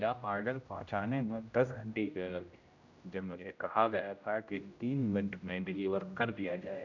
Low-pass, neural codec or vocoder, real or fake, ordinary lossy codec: 7.2 kHz; codec, 16 kHz, 0.5 kbps, X-Codec, HuBERT features, trained on general audio; fake; none